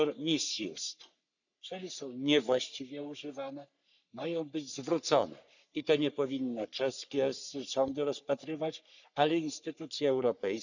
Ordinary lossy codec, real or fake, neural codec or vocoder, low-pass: none; fake; codec, 44.1 kHz, 3.4 kbps, Pupu-Codec; 7.2 kHz